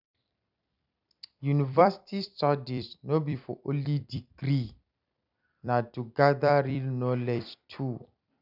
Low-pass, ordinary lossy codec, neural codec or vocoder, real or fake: 5.4 kHz; none; vocoder, 44.1 kHz, 128 mel bands every 256 samples, BigVGAN v2; fake